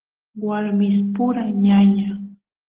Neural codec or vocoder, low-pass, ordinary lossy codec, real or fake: none; 3.6 kHz; Opus, 16 kbps; real